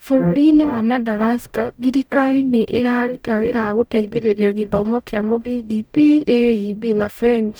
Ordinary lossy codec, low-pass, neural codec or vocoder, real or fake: none; none; codec, 44.1 kHz, 0.9 kbps, DAC; fake